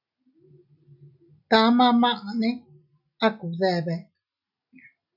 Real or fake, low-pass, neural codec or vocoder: real; 5.4 kHz; none